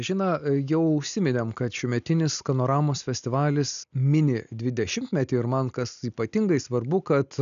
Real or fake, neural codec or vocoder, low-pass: real; none; 7.2 kHz